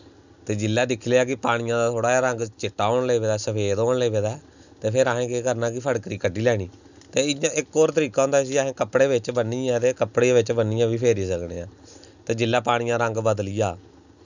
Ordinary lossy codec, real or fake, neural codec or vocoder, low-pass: none; real; none; 7.2 kHz